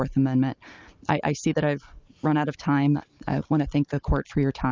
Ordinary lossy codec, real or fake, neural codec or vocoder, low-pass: Opus, 24 kbps; real; none; 7.2 kHz